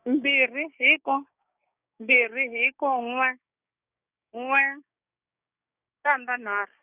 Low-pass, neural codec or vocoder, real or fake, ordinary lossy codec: 3.6 kHz; codec, 16 kHz, 6 kbps, DAC; fake; none